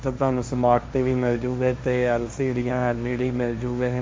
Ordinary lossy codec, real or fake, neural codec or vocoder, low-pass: none; fake; codec, 16 kHz, 1.1 kbps, Voila-Tokenizer; none